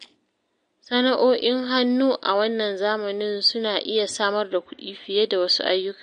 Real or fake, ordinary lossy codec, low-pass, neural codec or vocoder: real; MP3, 48 kbps; 9.9 kHz; none